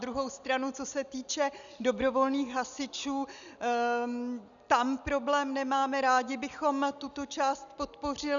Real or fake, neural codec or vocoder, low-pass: real; none; 7.2 kHz